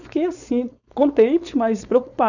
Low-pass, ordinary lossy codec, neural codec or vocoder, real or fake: 7.2 kHz; none; codec, 16 kHz, 4.8 kbps, FACodec; fake